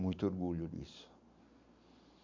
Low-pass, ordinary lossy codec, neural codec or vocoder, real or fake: 7.2 kHz; MP3, 64 kbps; none; real